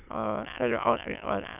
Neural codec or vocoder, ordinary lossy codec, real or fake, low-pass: autoencoder, 22.05 kHz, a latent of 192 numbers a frame, VITS, trained on many speakers; none; fake; 3.6 kHz